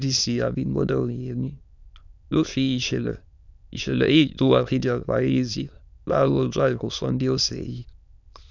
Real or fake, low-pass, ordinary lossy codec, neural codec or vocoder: fake; 7.2 kHz; none; autoencoder, 22.05 kHz, a latent of 192 numbers a frame, VITS, trained on many speakers